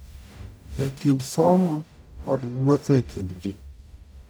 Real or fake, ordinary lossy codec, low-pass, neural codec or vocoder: fake; none; none; codec, 44.1 kHz, 0.9 kbps, DAC